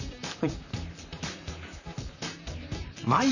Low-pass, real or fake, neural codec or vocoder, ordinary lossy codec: 7.2 kHz; fake; vocoder, 44.1 kHz, 128 mel bands, Pupu-Vocoder; none